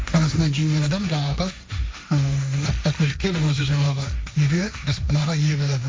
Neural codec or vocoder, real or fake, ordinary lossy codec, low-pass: codec, 16 kHz, 1.1 kbps, Voila-Tokenizer; fake; none; none